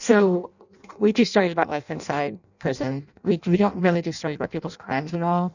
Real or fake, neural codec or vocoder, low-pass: fake; codec, 16 kHz in and 24 kHz out, 0.6 kbps, FireRedTTS-2 codec; 7.2 kHz